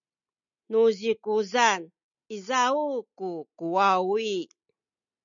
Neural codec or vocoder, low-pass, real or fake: none; 7.2 kHz; real